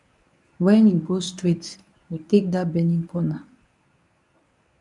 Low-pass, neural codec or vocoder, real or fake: 10.8 kHz; codec, 24 kHz, 0.9 kbps, WavTokenizer, medium speech release version 1; fake